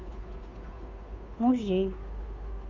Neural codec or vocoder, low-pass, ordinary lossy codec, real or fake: codec, 16 kHz, 2 kbps, FunCodec, trained on Chinese and English, 25 frames a second; 7.2 kHz; none; fake